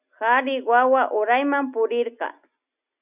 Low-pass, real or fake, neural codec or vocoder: 3.6 kHz; real; none